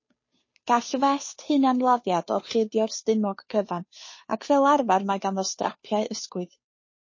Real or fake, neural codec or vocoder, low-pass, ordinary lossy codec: fake; codec, 16 kHz, 2 kbps, FunCodec, trained on Chinese and English, 25 frames a second; 7.2 kHz; MP3, 32 kbps